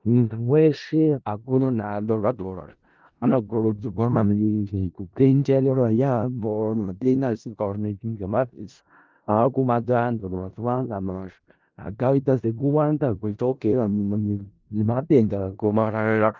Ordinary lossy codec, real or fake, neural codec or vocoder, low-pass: Opus, 24 kbps; fake; codec, 16 kHz in and 24 kHz out, 0.4 kbps, LongCat-Audio-Codec, four codebook decoder; 7.2 kHz